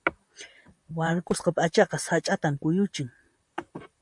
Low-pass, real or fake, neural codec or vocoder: 10.8 kHz; fake; vocoder, 44.1 kHz, 128 mel bands, Pupu-Vocoder